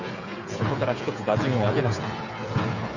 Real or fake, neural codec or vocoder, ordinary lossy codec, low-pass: fake; codec, 16 kHz, 8 kbps, FreqCodec, smaller model; none; 7.2 kHz